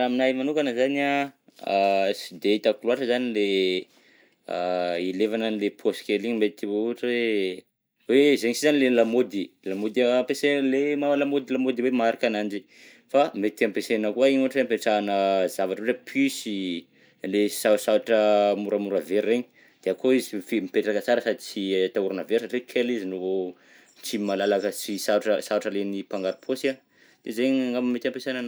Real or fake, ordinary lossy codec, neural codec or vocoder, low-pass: real; none; none; none